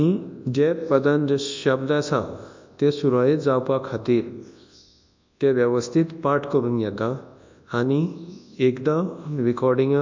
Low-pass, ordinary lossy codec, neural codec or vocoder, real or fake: 7.2 kHz; MP3, 64 kbps; codec, 24 kHz, 0.9 kbps, WavTokenizer, large speech release; fake